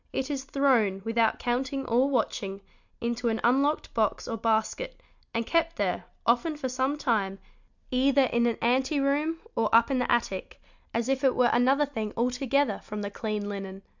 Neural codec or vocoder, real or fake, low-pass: none; real; 7.2 kHz